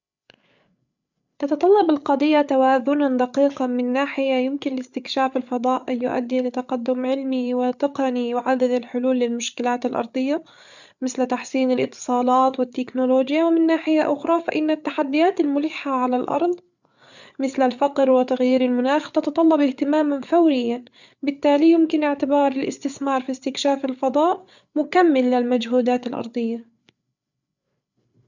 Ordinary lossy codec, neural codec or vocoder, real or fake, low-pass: none; codec, 16 kHz, 8 kbps, FreqCodec, larger model; fake; 7.2 kHz